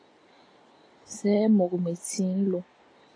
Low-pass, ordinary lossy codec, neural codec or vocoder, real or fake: 9.9 kHz; AAC, 32 kbps; none; real